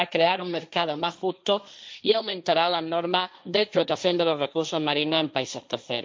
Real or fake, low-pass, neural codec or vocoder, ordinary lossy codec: fake; none; codec, 16 kHz, 1.1 kbps, Voila-Tokenizer; none